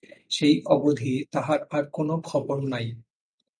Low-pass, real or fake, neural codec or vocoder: 9.9 kHz; real; none